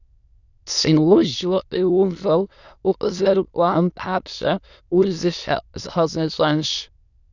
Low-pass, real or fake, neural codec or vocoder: 7.2 kHz; fake; autoencoder, 22.05 kHz, a latent of 192 numbers a frame, VITS, trained on many speakers